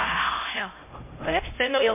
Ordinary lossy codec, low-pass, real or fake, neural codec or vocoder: MP3, 24 kbps; 3.6 kHz; fake; codec, 16 kHz, 0.5 kbps, X-Codec, HuBERT features, trained on LibriSpeech